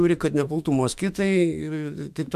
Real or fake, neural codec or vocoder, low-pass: fake; autoencoder, 48 kHz, 32 numbers a frame, DAC-VAE, trained on Japanese speech; 14.4 kHz